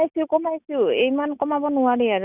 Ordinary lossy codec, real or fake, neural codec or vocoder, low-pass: none; real; none; 3.6 kHz